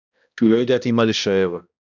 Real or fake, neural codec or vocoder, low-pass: fake; codec, 16 kHz, 1 kbps, X-Codec, HuBERT features, trained on balanced general audio; 7.2 kHz